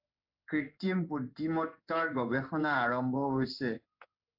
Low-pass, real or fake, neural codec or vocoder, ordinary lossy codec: 5.4 kHz; fake; codec, 16 kHz in and 24 kHz out, 1 kbps, XY-Tokenizer; AAC, 48 kbps